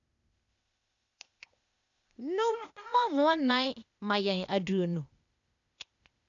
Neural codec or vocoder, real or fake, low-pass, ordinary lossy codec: codec, 16 kHz, 0.8 kbps, ZipCodec; fake; 7.2 kHz; none